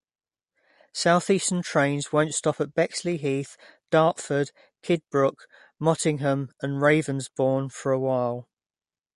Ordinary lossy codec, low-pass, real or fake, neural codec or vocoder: MP3, 48 kbps; 14.4 kHz; real; none